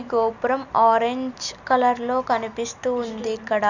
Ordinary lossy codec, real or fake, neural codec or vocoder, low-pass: none; real; none; 7.2 kHz